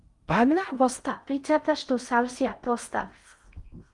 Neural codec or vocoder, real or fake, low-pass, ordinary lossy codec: codec, 16 kHz in and 24 kHz out, 0.6 kbps, FocalCodec, streaming, 2048 codes; fake; 10.8 kHz; Opus, 32 kbps